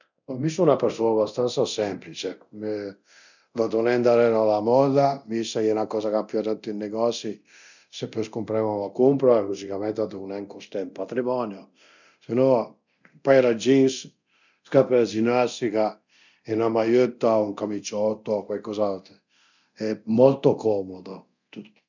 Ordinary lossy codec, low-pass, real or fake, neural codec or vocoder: none; 7.2 kHz; fake; codec, 24 kHz, 0.9 kbps, DualCodec